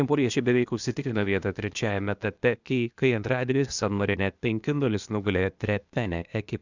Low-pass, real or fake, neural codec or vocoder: 7.2 kHz; fake; codec, 16 kHz, 0.8 kbps, ZipCodec